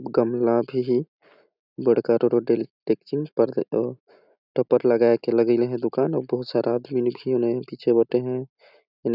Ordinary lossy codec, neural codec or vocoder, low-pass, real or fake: none; none; 5.4 kHz; real